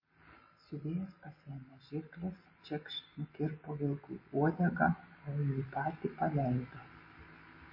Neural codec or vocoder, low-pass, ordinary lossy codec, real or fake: none; 5.4 kHz; MP3, 24 kbps; real